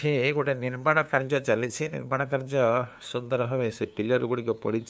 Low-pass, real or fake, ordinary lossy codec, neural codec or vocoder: none; fake; none; codec, 16 kHz, 2 kbps, FunCodec, trained on LibriTTS, 25 frames a second